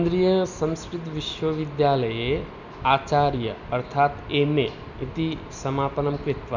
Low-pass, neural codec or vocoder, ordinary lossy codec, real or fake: 7.2 kHz; none; none; real